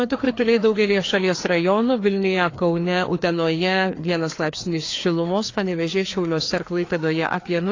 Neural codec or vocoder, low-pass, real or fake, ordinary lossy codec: codec, 16 kHz, 2 kbps, FreqCodec, larger model; 7.2 kHz; fake; AAC, 32 kbps